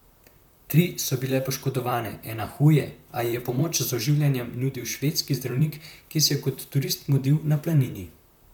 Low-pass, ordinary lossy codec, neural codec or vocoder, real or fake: 19.8 kHz; none; vocoder, 44.1 kHz, 128 mel bands, Pupu-Vocoder; fake